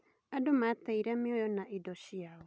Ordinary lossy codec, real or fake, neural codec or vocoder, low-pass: none; real; none; none